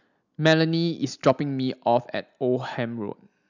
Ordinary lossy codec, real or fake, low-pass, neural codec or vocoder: none; real; 7.2 kHz; none